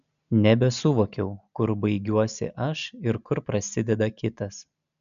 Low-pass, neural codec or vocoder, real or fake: 7.2 kHz; none; real